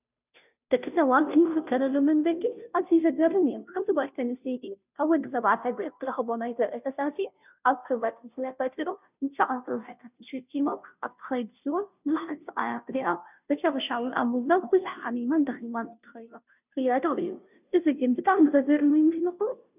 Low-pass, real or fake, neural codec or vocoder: 3.6 kHz; fake; codec, 16 kHz, 0.5 kbps, FunCodec, trained on Chinese and English, 25 frames a second